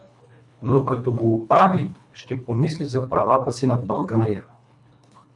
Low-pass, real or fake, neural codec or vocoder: 10.8 kHz; fake; codec, 24 kHz, 1.5 kbps, HILCodec